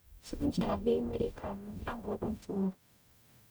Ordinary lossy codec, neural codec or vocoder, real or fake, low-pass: none; codec, 44.1 kHz, 0.9 kbps, DAC; fake; none